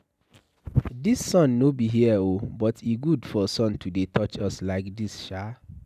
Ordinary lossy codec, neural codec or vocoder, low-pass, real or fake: none; none; 14.4 kHz; real